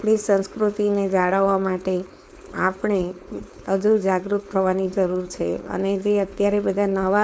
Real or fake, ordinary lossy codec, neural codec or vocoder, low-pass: fake; none; codec, 16 kHz, 4.8 kbps, FACodec; none